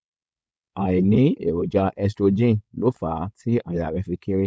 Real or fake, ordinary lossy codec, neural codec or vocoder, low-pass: fake; none; codec, 16 kHz, 4.8 kbps, FACodec; none